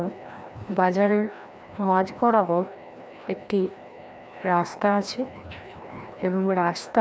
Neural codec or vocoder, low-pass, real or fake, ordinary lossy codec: codec, 16 kHz, 1 kbps, FreqCodec, larger model; none; fake; none